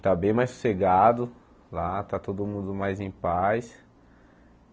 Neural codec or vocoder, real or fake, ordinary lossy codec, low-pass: none; real; none; none